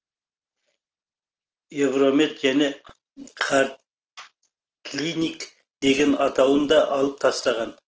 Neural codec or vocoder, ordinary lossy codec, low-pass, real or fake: none; Opus, 16 kbps; 7.2 kHz; real